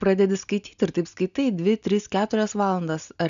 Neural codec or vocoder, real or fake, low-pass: none; real; 7.2 kHz